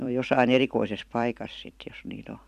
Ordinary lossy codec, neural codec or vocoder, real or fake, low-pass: none; vocoder, 44.1 kHz, 128 mel bands every 256 samples, BigVGAN v2; fake; 14.4 kHz